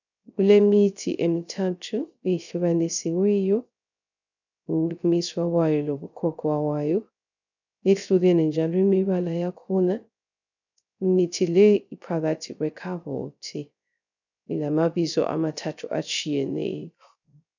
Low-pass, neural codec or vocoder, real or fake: 7.2 kHz; codec, 16 kHz, 0.3 kbps, FocalCodec; fake